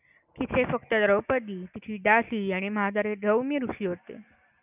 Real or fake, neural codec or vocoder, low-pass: real; none; 3.6 kHz